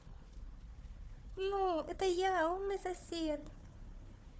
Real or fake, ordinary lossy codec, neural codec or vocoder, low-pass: fake; none; codec, 16 kHz, 4 kbps, FunCodec, trained on Chinese and English, 50 frames a second; none